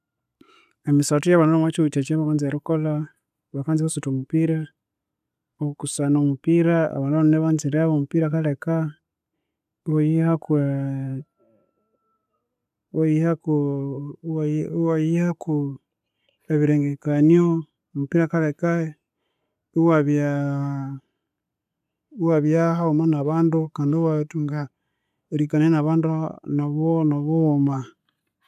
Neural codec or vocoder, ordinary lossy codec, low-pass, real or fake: none; none; 14.4 kHz; real